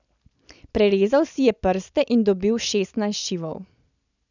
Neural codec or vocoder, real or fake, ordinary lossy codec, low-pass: none; real; none; 7.2 kHz